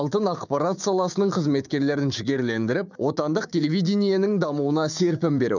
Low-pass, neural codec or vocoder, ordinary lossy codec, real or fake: 7.2 kHz; codec, 16 kHz, 6 kbps, DAC; none; fake